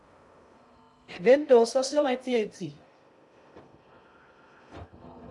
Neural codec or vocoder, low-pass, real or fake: codec, 16 kHz in and 24 kHz out, 0.6 kbps, FocalCodec, streaming, 2048 codes; 10.8 kHz; fake